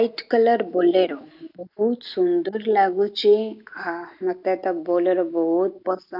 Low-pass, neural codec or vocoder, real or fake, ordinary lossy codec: 5.4 kHz; none; real; none